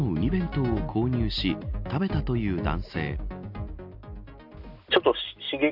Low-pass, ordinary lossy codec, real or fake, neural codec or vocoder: 5.4 kHz; AAC, 48 kbps; real; none